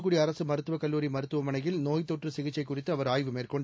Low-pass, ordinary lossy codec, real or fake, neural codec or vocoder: none; none; real; none